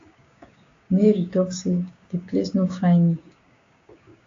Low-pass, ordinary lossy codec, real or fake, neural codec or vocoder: 7.2 kHz; AAC, 48 kbps; fake; codec, 16 kHz, 6 kbps, DAC